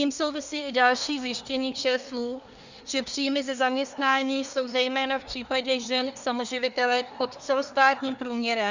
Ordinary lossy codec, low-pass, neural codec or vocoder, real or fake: Opus, 64 kbps; 7.2 kHz; codec, 24 kHz, 1 kbps, SNAC; fake